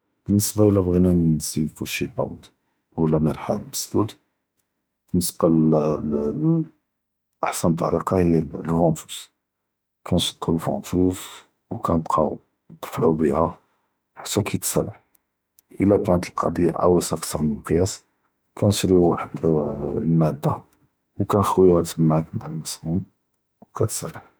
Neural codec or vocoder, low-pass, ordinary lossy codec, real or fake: autoencoder, 48 kHz, 32 numbers a frame, DAC-VAE, trained on Japanese speech; none; none; fake